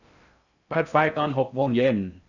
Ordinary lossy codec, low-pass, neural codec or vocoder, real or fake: none; 7.2 kHz; codec, 16 kHz in and 24 kHz out, 0.6 kbps, FocalCodec, streaming, 2048 codes; fake